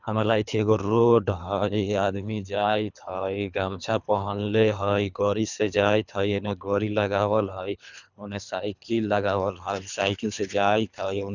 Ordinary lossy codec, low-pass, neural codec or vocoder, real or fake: none; 7.2 kHz; codec, 24 kHz, 3 kbps, HILCodec; fake